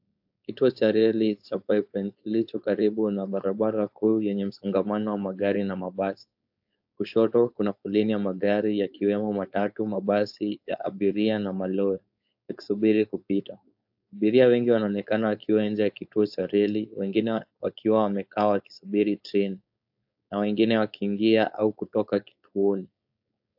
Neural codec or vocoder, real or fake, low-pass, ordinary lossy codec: codec, 16 kHz, 4.8 kbps, FACodec; fake; 5.4 kHz; AAC, 48 kbps